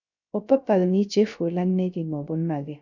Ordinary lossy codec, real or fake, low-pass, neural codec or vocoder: none; fake; 7.2 kHz; codec, 16 kHz, 0.3 kbps, FocalCodec